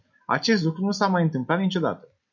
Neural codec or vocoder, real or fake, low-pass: none; real; 7.2 kHz